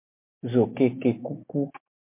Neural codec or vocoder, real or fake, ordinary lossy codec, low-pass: none; real; MP3, 24 kbps; 3.6 kHz